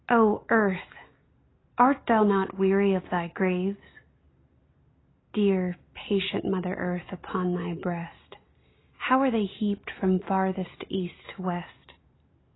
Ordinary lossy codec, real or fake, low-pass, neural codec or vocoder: AAC, 16 kbps; real; 7.2 kHz; none